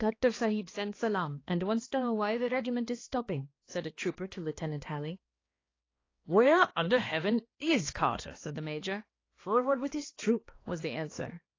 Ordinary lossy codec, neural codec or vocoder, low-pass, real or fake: AAC, 32 kbps; codec, 16 kHz, 2 kbps, X-Codec, HuBERT features, trained on balanced general audio; 7.2 kHz; fake